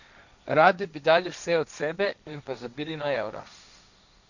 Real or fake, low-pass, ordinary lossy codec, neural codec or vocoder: fake; 7.2 kHz; none; codec, 16 kHz, 1.1 kbps, Voila-Tokenizer